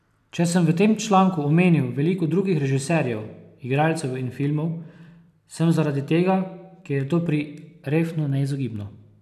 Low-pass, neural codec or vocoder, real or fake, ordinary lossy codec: 14.4 kHz; vocoder, 44.1 kHz, 128 mel bands every 256 samples, BigVGAN v2; fake; none